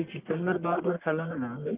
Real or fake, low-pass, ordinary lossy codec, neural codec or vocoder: fake; 3.6 kHz; Opus, 32 kbps; codec, 44.1 kHz, 1.7 kbps, Pupu-Codec